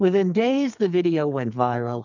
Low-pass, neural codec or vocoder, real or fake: 7.2 kHz; codec, 44.1 kHz, 2.6 kbps, SNAC; fake